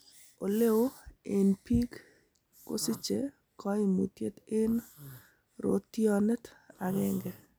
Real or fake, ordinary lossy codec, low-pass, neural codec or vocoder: real; none; none; none